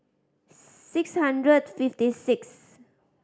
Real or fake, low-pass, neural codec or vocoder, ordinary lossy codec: real; none; none; none